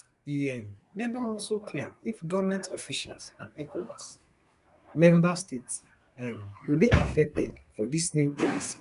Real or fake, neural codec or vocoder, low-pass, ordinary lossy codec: fake; codec, 24 kHz, 1 kbps, SNAC; 10.8 kHz; none